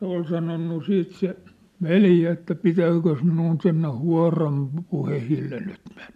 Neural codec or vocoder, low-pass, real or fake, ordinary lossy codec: none; 14.4 kHz; real; MP3, 96 kbps